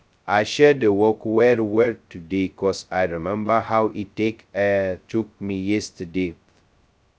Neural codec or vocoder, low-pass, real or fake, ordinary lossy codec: codec, 16 kHz, 0.2 kbps, FocalCodec; none; fake; none